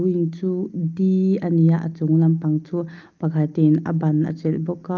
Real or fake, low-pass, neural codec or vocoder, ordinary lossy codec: real; 7.2 kHz; none; Opus, 32 kbps